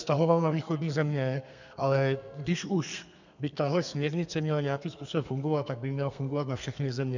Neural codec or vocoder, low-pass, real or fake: codec, 32 kHz, 1.9 kbps, SNAC; 7.2 kHz; fake